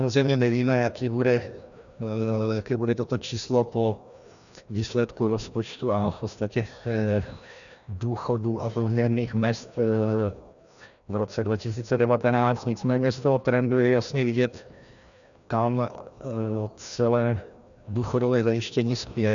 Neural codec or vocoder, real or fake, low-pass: codec, 16 kHz, 1 kbps, FreqCodec, larger model; fake; 7.2 kHz